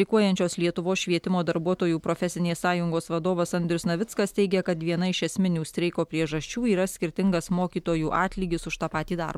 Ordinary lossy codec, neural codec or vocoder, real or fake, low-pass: MP3, 96 kbps; none; real; 19.8 kHz